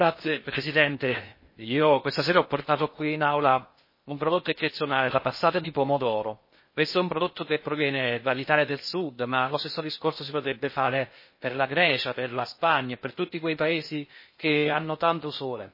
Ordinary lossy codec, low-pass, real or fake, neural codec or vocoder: MP3, 24 kbps; 5.4 kHz; fake; codec, 16 kHz in and 24 kHz out, 0.6 kbps, FocalCodec, streaming, 2048 codes